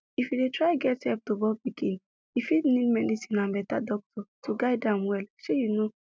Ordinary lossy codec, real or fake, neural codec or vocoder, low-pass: none; real; none; none